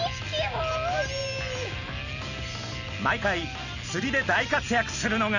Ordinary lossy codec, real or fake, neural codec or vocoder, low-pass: none; real; none; 7.2 kHz